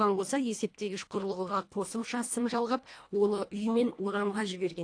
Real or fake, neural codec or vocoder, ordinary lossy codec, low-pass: fake; codec, 24 kHz, 1.5 kbps, HILCodec; AAC, 48 kbps; 9.9 kHz